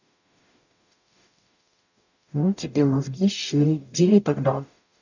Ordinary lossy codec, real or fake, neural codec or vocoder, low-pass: none; fake; codec, 44.1 kHz, 0.9 kbps, DAC; 7.2 kHz